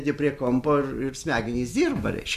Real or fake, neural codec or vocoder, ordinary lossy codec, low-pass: real; none; MP3, 64 kbps; 14.4 kHz